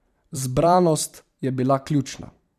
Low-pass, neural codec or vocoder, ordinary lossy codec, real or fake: 14.4 kHz; vocoder, 44.1 kHz, 128 mel bands every 512 samples, BigVGAN v2; none; fake